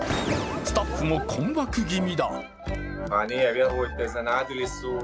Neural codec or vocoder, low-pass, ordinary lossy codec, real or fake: none; none; none; real